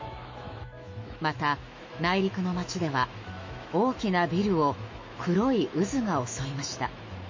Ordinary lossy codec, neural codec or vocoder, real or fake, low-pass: MP3, 32 kbps; autoencoder, 48 kHz, 128 numbers a frame, DAC-VAE, trained on Japanese speech; fake; 7.2 kHz